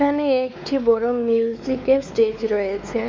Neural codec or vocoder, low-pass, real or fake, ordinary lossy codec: codec, 16 kHz, 4 kbps, X-Codec, WavLM features, trained on Multilingual LibriSpeech; none; fake; none